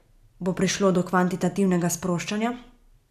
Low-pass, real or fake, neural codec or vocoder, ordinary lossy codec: 14.4 kHz; real; none; none